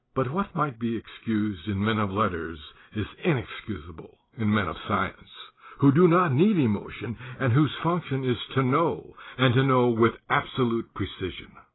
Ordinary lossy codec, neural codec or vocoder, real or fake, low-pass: AAC, 16 kbps; none; real; 7.2 kHz